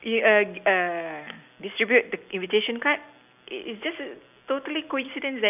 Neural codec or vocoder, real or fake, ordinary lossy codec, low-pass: none; real; none; 3.6 kHz